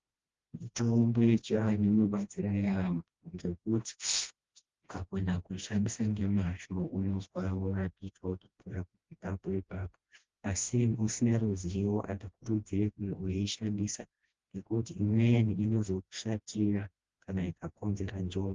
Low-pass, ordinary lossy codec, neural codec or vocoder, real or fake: 7.2 kHz; Opus, 32 kbps; codec, 16 kHz, 1 kbps, FreqCodec, smaller model; fake